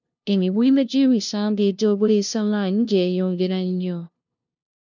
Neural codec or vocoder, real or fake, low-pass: codec, 16 kHz, 0.5 kbps, FunCodec, trained on LibriTTS, 25 frames a second; fake; 7.2 kHz